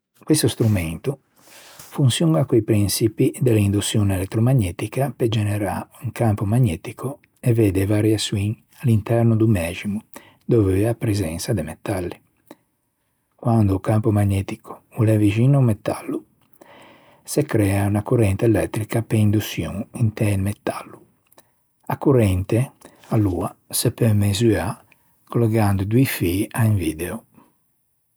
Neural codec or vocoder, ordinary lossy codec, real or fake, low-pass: none; none; real; none